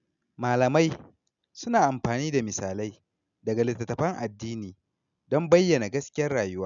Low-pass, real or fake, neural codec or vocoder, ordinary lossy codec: 7.2 kHz; real; none; none